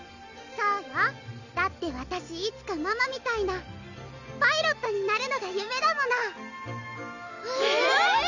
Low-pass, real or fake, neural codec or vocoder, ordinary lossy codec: 7.2 kHz; real; none; none